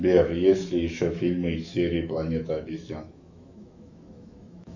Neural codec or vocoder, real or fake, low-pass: autoencoder, 48 kHz, 128 numbers a frame, DAC-VAE, trained on Japanese speech; fake; 7.2 kHz